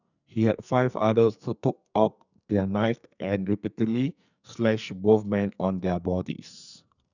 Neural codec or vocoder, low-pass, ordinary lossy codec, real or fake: codec, 44.1 kHz, 2.6 kbps, SNAC; 7.2 kHz; none; fake